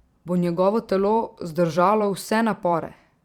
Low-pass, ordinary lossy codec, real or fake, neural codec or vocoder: 19.8 kHz; Opus, 64 kbps; fake; vocoder, 44.1 kHz, 128 mel bands every 512 samples, BigVGAN v2